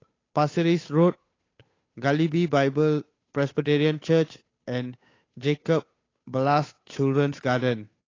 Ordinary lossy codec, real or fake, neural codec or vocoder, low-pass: AAC, 32 kbps; fake; codec, 16 kHz, 8 kbps, FunCodec, trained on Chinese and English, 25 frames a second; 7.2 kHz